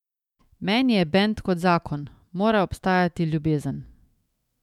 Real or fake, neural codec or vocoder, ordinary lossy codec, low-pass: fake; autoencoder, 48 kHz, 128 numbers a frame, DAC-VAE, trained on Japanese speech; MP3, 96 kbps; 19.8 kHz